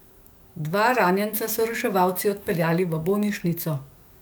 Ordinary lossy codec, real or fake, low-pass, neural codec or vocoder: none; fake; none; codec, 44.1 kHz, 7.8 kbps, DAC